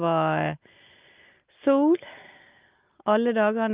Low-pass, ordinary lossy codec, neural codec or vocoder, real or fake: 3.6 kHz; Opus, 24 kbps; none; real